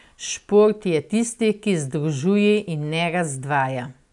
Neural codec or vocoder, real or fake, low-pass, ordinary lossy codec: none; real; 10.8 kHz; none